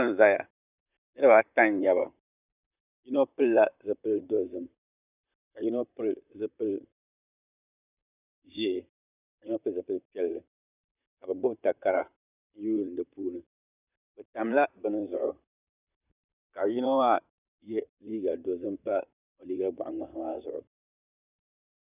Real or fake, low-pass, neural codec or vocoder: fake; 3.6 kHz; vocoder, 44.1 kHz, 128 mel bands, Pupu-Vocoder